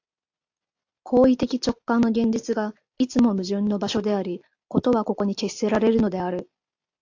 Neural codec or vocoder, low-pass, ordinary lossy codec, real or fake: none; 7.2 kHz; AAC, 48 kbps; real